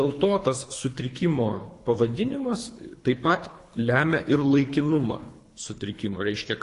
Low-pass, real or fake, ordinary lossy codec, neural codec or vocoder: 10.8 kHz; fake; AAC, 48 kbps; codec, 24 kHz, 3 kbps, HILCodec